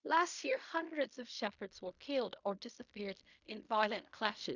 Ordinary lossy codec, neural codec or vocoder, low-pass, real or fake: none; codec, 16 kHz in and 24 kHz out, 0.4 kbps, LongCat-Audio-Codec, fine tuned four codebook decoder; 7.2 kHz; fake